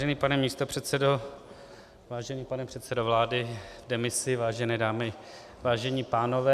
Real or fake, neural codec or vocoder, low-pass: real; none; 14.4 kHz